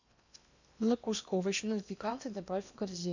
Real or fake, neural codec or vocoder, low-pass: fake; codec, 16 kHz in and 24 kHz out, 0.8 kbps, FocalCodec, streaming, 65536 codes; 7.2 kHz